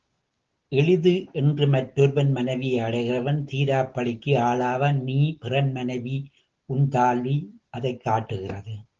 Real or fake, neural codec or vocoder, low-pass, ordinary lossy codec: real; none; 7.2 kHz; Opus, 16 kbps